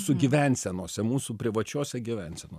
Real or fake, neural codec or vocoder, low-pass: real; none; 14.4 kHz